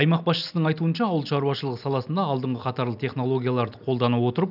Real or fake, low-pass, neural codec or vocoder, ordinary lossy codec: real; 5.4 kHz; none; none